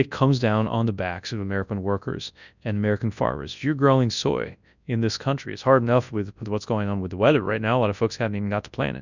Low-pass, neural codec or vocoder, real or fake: 7.2 kHz; codec, 24 kHz, 0.9 kbps, WavTokenizer, large speech release; fake